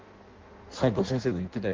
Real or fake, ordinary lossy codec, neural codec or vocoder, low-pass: fake; Opus, 16 kbps; codec, 16 kHz in and 24 kHz out, 0.6 kbps, FireRedTTS-2 codec; 7.2 kHz